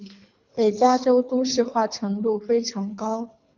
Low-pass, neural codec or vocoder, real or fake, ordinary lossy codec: 7.2 kHz; codec, 24 kHz, 3 kbps, HILCodec; fake; MP3, 64 kbps